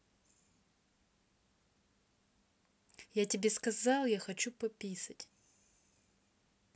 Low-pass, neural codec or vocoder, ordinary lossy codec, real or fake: none; none; none; real